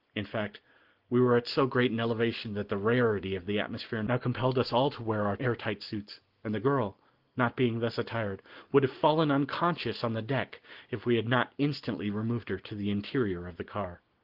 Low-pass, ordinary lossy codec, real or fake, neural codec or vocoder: 5.4 kHz; Opus, 16 kbps; real; none